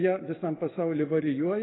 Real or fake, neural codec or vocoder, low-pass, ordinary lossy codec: fake; codec, 44.1 kHz, 7.8 kbps, DAC; 7.2 kHz; AAC, 16 kbps